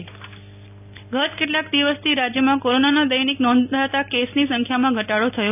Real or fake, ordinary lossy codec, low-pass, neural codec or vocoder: real; none; 3.6 kHz; none